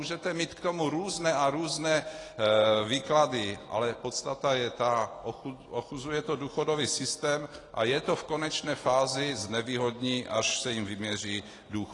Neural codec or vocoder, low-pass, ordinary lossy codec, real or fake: none; 10.8 kHz; AAC, 32 kbps; real